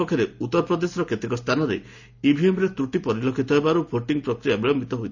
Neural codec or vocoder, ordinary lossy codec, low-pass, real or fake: none; none; 7.2 kHz; real